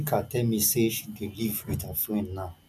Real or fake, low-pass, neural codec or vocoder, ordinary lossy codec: real; 14.4 kHz; none; none